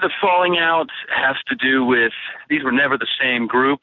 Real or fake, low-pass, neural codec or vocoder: real; 7.2 kHz; none